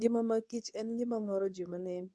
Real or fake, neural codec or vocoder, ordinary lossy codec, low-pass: fake; codec, 24 kHz, 0.9 kbps, WavTokenizer, medium speech release version 2; none; none